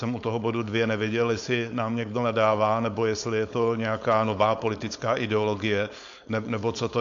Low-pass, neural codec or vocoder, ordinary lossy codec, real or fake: 7.2 kHz; codec, 16 kHz, 4.8 kbps, FACodec; AAC, 64 kbps; fake